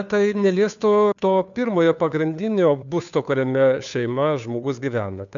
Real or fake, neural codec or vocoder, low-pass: fake; codec, 16 kHz, 2 kbps, FunCodec, trained on Chinese and English, 25 frames a second; 7.2 kHz